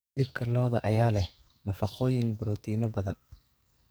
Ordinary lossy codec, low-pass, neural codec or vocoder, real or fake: none; none; codec, 44.1 kHz, 2.6 kbps, SNAC; fake